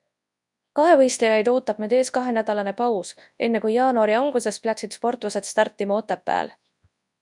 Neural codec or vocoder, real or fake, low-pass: codec, 24 kHz, 0.9 kbps, WavTokenizer, large speech release; fake; 10.8 kHz